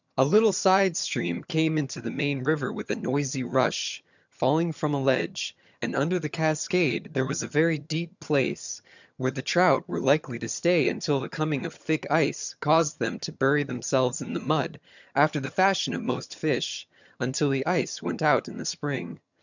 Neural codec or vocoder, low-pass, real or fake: vocoder, 22.05 kHz, 80 mel bands, HiFi-GAN; 7.2 kHz; fake